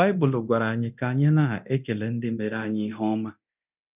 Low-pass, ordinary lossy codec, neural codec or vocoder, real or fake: 3.6 kHz; none; codec, 24 kHz, 0.9 kbps, DualCodec; fake